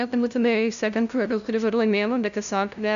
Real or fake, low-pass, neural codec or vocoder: fake; 7.2 kHz; codec, 16 kHz, 0.5 kbps, FunCodec, trained on LibriTTS, 25 frames a second